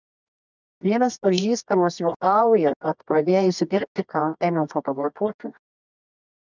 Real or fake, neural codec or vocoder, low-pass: fake; codec, 24 kHz, 0.9 kbps, WavTokenizer, medium music audio release; 7.2 kHz